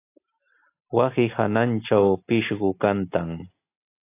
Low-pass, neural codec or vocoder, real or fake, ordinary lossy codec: 3.6 kHz; none; real; AAC, 24 kbps